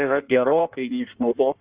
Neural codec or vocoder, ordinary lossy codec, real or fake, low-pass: codec, 16 kHz in and 24 kHz out, 1.1 kbps, FireRedTTS-2 codec; Opus, 64 kbps; fake; 3.6 kHz